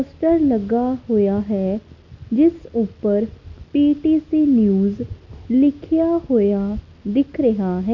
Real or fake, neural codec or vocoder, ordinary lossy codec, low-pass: real; none; none; 7.2 kHz